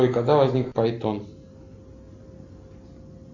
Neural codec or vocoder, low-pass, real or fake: none; 7.2 kHz; real